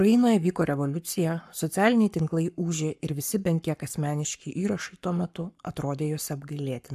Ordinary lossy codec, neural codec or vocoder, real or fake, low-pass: AAC, 96 kbps; codec, 44.1 kHz, 7.8 kbps, Pupu-Codec; fake; 14.4 kHz